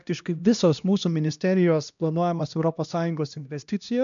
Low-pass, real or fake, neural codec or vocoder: 7.2 kHz; fake; codec, 16 kHz, 1 kbps, X-Codec, HuBERT features, trained on LibriSpeech